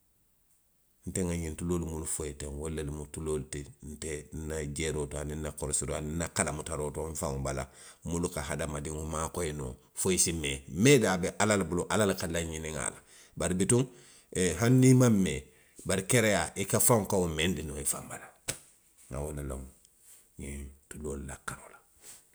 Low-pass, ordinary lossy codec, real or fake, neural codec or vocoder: none; none; real; none